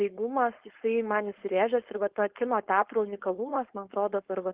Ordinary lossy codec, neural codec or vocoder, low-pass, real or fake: Opus, 16 kbps; codec, 16 kHz, 4.8 kbps, FACodec; 3.6 kHz; fake